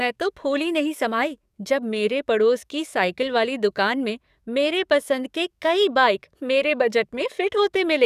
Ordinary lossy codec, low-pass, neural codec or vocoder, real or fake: none; 14.4 kHz; codec, 44.1 kHz, 7.8 kbps, DAC; fake